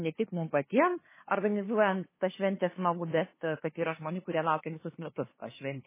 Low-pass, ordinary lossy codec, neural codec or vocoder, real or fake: 3.6 kHz; MP3, 16 kbps; codec, 16 kHz, 2 kbps, FunCodec, trained on LibriTTS, 25 frames a second; fake